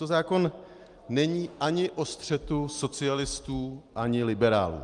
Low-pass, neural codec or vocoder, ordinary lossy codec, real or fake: 10.8 kHz; none; Opus, 32 kbps; real